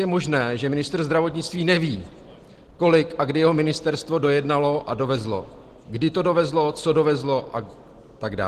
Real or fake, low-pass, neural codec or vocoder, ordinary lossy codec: real; 14.4 kHz; none; Opus, 16 kbps